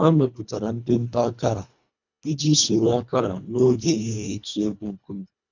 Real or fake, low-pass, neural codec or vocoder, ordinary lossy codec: fake; 7.2 kHz; codec, 24 kHz, 1.5 kbps, HILCodec; none